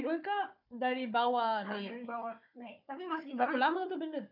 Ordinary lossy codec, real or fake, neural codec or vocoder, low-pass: none; fake; codec, 16 kHz, 4 kbps, FunCodec, trained on Chinese and English, 50 frames a second; 5.4 kHz